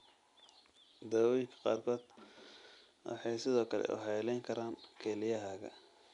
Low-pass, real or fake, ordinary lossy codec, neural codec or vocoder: 10.8 kHz; real; none; none